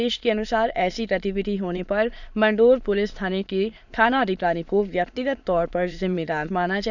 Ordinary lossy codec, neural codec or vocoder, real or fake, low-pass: none; autoencoder, 22.05 kHz, a latent of 192 numbers a frame, VITS, trained on many speakers; fake; 7.2 kHz